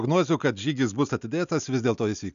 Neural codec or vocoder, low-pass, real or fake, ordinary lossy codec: none; 7.2 kHz; real; MP3, 96 kbps